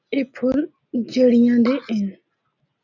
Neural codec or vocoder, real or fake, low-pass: none; real; 7.2 kHz